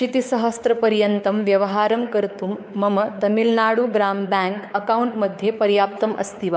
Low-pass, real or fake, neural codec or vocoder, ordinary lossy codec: none; fake; codec, 16 kHz, 4 kbps, X-Codec, WavLM features, trained on Multilingual LibriSpeech; none